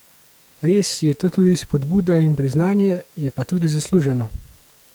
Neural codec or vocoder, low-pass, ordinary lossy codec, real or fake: codec, 44.1 kHz, 2.6 kbps, SNAC; none; none; fake